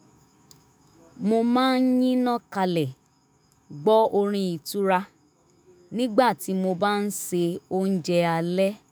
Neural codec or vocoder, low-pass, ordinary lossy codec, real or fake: autoencoder, 48 kHz, 128 numbers a frame, DAC-VAE, trained on Japanese speech; none; none; fake